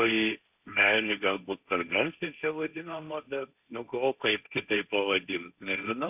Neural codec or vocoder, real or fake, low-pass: codec, 16 kHz, 1.1 kbps, Voila-Tokenizer; fake; 3.6 kHz